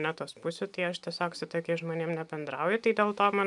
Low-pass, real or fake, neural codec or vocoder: 10.8 kHz; real; none